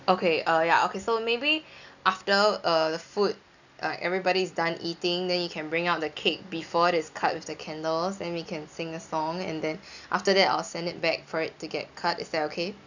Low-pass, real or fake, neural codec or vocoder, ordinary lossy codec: 7.2 kHz; real; none; none